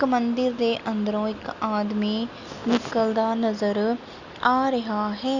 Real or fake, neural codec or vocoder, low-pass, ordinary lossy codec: real; none; 7.2 kHz; Opus, 64 kbps